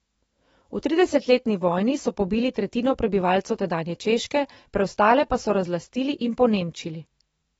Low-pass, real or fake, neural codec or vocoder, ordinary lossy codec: 19.8 kHz; fake; autoencoder, 48 kHz, 128 numbers a frame, DAC-VAE, trained on Japanese speech; AAC, 24 kbps